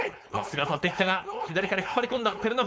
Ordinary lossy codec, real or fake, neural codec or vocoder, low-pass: none; fake; codec, 16 kHz, 4.8 kbps, FACodec; none